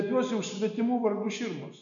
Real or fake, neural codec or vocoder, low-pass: real; none; 7.2 kHz